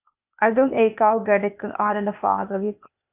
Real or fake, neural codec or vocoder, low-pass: fake; codec, 16 kHz, 0.8 kbps, ZipCodec; 3.6 kHz